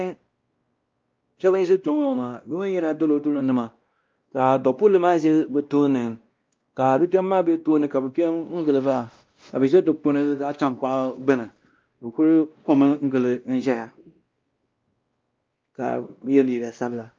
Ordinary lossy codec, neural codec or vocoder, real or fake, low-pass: Opus, 24 kbps; codec, 16 kHz, 1 kbps, X-Codec, WavLM features, trained on Multilingual LibriSpeech; fake; 7.2 kHz